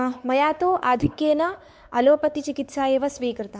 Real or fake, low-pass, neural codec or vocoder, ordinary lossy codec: fake; none; codec, 16 kHz, 4 kbps, X-Codec, WavLM features, trained on Multilingual LibriSpeech; none